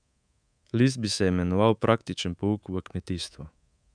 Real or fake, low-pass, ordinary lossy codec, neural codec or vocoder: fake; 9.9 kHz; none; codec, 24 kHz, 3.1 kbps, DualCodec